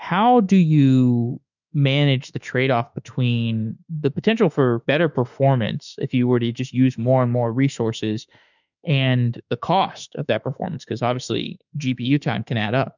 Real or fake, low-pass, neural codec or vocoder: fake; 7.2 kHz; autoencoder, 48 kHz, 32 numbers a frame, DAC-VAE, trained on Japanese speech